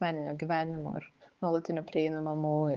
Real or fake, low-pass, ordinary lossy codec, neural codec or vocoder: fake; 7.2 kHz; Opus, 16 kbps; codec, 16 kHz, 4 kbps, X-Codec, HuBERT features, trained on balanced general audio